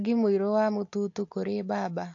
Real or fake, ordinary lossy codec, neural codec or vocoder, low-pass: real; AAC, 48 kbps; none; 7.2 kHz